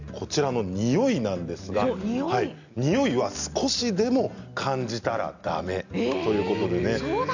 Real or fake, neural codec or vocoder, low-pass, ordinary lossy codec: real; none; 7.2 kHz; none